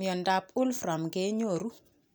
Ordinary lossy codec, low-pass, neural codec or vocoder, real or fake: none; none; none; real